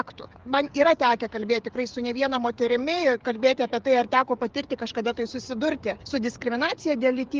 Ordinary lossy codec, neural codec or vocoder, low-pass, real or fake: Opus, 32 kbps; codec, 16 kHz, 8 kbps, FreqCodec, smaller model; 7.2 kHz; fake